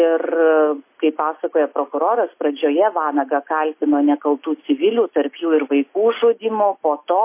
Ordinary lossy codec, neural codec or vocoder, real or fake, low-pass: MP3, 24 kbps; none; real; 3.6 kHz